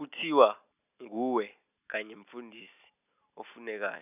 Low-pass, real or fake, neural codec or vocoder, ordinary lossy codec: 3.6 kHz; real; none; none